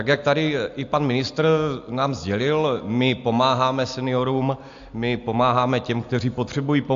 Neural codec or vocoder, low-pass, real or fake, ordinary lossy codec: none; 7.2 kHz; real; AAC, 64 kbps